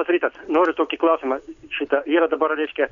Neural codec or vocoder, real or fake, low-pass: none; real; 7.2 kHz